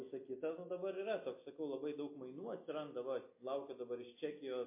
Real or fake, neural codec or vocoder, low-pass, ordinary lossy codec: real; none; 3.6 kHz; MP3, 24 kbps